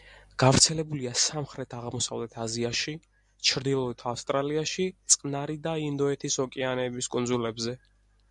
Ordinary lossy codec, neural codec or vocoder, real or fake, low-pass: MP3, 96 kbps; none; real; 10.8 kHz